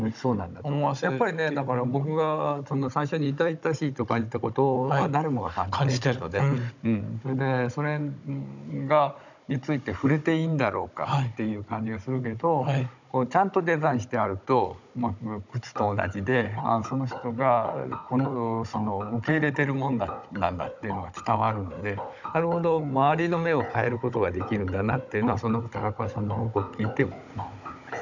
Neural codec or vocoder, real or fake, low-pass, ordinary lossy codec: codec, 16 kHz, 16 kbps, FunCodec, trained on Chinese and English, 50 frames a second; fake; 7.2 kHz; none